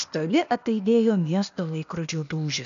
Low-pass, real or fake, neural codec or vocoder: 7.2 kHz; fake; codec, 16 kHz, 0.8 kbps, ZipCodec